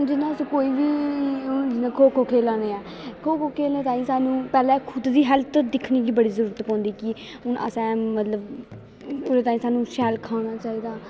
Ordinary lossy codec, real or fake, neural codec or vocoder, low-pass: none; real; none; none